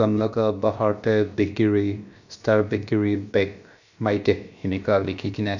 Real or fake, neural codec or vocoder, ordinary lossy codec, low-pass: fake; codec, 16 kHz, about 1 kbps, DyCAST, with the encoder's durations; none; 7.2 kHz